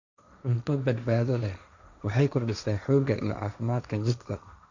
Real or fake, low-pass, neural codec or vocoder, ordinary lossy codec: fake; 7.2 kHz; codec, 16 kHz, 1.1 kbps, Voila-Tokenizer; none